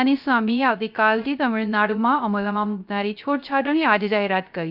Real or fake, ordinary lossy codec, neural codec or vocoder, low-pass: fake; none; codec, 16 kHz, 0.3 kbps, FocalCodec; 5.4 kHz